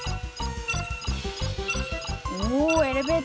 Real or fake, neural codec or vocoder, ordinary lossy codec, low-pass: real; none; none; none